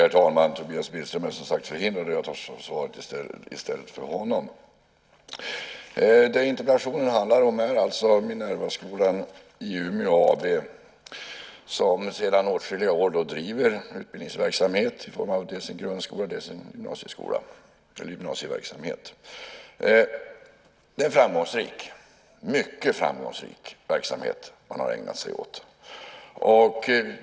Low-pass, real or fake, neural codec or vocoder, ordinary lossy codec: none; real; none; none